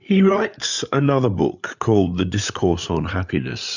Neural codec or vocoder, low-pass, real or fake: codec, 16 kHz, 8 kbps, FreqCodec, larger model; 7.2 kHz; fake